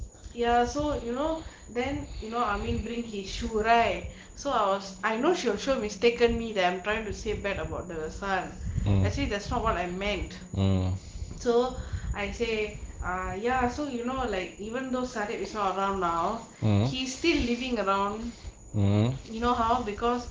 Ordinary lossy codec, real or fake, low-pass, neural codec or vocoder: Opus, 16 kbps; real; 7.2 kHz; none